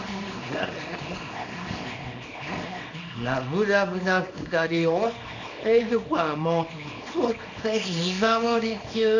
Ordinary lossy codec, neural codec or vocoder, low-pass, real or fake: none; codec, 24 kHz, 0.9 kbps, WavTokenizer, small release; 7.2 kHz; fake